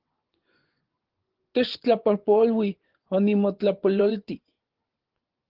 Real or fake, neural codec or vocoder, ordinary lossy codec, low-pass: fake; vocoder, 24 kHz, 100 mel bands, Vocos; Opus, 16 kbps; 5.4 kHz